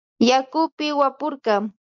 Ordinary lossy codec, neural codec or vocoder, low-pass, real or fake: MP3, 64 kbps; none; 7.2 kHz; real